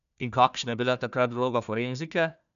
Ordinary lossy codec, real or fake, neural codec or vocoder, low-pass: none; fake; codec, 16 kHz, 1 kbps, FunCodec, trained on Chinese and English, 50 frames a second; 7.2 kHz